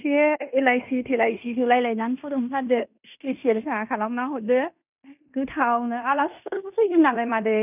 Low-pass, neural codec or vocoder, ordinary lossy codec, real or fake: 3.6 kHz; codec, 16 kHz in and 24 kHz out, 0.9 kbps, LongCat-Audio-Codec, fine tuned four codebook decoder; none; fake